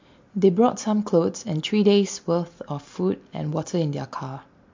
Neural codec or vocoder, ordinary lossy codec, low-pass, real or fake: none; MP3, 48 kbps; 7.2 kHz; real